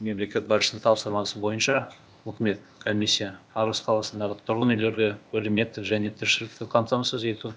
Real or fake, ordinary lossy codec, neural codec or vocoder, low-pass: fake; none; codec, 16 kHz, 0.8 kbps, ZipCodec; none